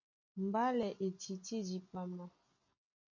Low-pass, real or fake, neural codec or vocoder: 7.2 kHz; real; none